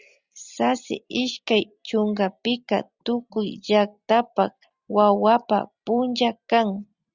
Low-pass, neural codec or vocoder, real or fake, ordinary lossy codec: 7.2 kHz; none; real; Opus, 64 kbps